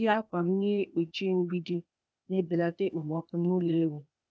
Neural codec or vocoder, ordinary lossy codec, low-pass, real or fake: codec, 16 kHz, 0.8 kbps, ZipCodec; none; none; fake